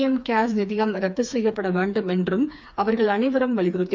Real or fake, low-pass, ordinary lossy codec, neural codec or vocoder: fake; none; none; codec, 16 kHz, 4 kbps, FreqCodec, smaller model